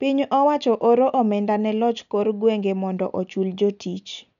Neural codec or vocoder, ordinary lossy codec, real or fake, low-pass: none; none; real; 7.2 kHz